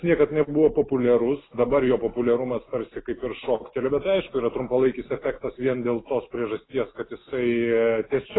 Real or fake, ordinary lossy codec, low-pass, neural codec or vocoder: real; AAC, 16 kbps; 7.2 kHz; none